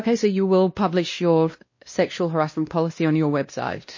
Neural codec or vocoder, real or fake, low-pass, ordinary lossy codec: codec, 16 kHz, 1 kbps, X-Codec, WavLM features, trained on Multilingual LibriSpeech; fake; 7.2 kHz; MP3, 32 kbps